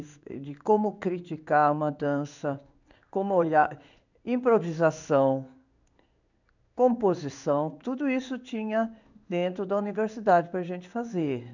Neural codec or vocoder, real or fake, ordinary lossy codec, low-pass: codec, 16 kHz in and 24 kHz out, 1 kbps, XY-Tokenizer; fake; none; 7.2 kHz